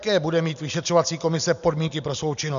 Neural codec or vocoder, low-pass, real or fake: none; 7.2 kHz; real